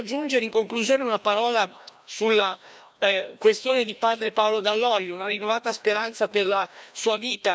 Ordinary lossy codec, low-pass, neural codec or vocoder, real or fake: none; none; codec, 16 kHz, 1 kbps, FreqCodec, larger model; fake